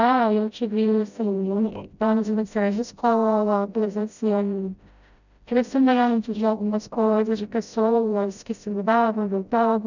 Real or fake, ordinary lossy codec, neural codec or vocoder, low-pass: fake; none; codec, 16 kHz, 0.5 kbps, FreqCodec, smaller model; 7.2 kHz